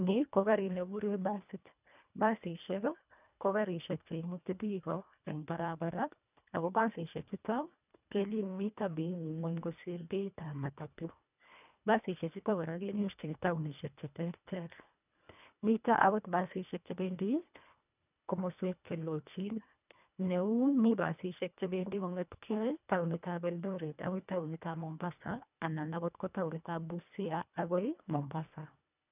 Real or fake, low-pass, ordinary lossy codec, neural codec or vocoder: fake; 3.6 kHz; none; codec, 24 kHz, 1.5 kbps, HILCodec